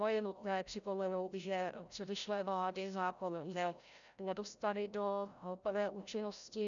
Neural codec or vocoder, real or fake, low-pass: codec, 16 kHz, 0.5 kbps, FreqCodec, larger model; fake; 7.2 kHz